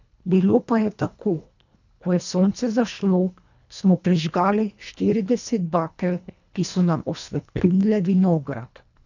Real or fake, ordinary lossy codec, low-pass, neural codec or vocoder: fake; none; 7.2 kHz; codec, 24 kHz, 1.5 kbps, HILCodec